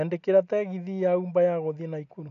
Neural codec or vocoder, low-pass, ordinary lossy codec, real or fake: none; 7.2 kHz; none; real